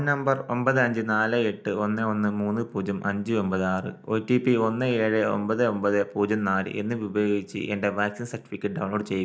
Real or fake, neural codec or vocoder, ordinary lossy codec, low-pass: real; none; none; none